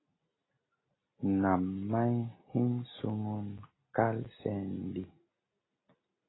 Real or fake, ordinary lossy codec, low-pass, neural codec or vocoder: real; AAC, 16 kbps; 7.2 kHz; none